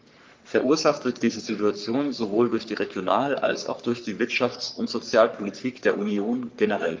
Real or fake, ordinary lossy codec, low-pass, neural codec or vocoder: fake; Opus, 24 kbps; 7.2 kHz; codec, 44.1 kHz, 3.4 kbps, Pupu-Codec